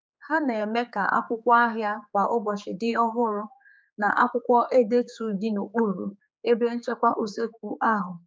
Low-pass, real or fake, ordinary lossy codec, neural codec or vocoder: none; fake; none; codec, 16 kHz, 4 kbps, X-Codec, HuBERT features, trained on general audio